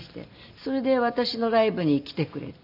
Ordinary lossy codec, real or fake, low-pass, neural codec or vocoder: none; real; 5.4 kHz; none